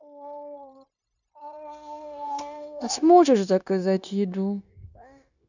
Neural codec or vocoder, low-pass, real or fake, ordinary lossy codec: codec, 16 kHz, 0.9 kbps, LongCat-Audio-Codec; 7.2 kHz; fake; none